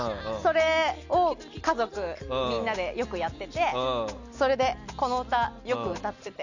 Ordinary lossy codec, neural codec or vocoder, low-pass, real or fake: none; none; 7.2 kHz; real